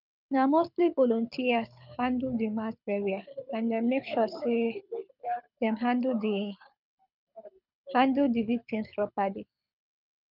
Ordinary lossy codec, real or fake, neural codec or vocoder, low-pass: none; fake; codec, 24 kHz, 6 kbps, HILCodec; 5.4 kHz